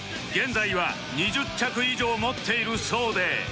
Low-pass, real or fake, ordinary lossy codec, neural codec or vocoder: none; real; none; none